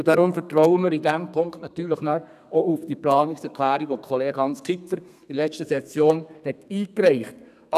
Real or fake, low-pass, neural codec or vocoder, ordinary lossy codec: fake; 14.4 kHz; codec, 32 kHz, 1.9 kbps, SNAC; none